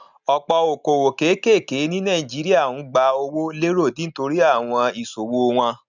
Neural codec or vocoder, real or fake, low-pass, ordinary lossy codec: none; real; 7.2 kHz; none